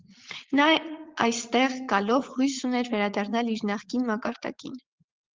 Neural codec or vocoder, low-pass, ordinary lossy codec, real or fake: vocoder, 44.1 kHz, 80 mel bands, Vocos; 7.2 kHz; Opus, 16 kbps; fake